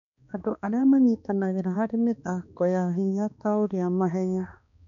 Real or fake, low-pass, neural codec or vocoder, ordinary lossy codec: fake; 7.2 kHz; codec, 16 kHz, 2 kbps, X-Codec, HuBERT features, trained on balanced general audio; none